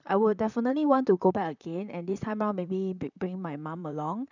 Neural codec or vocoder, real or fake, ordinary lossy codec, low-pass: vocoder, 44.1 kHz, 128 mel bands, Pupu-Vocoder; fake; none; 7.2 kHz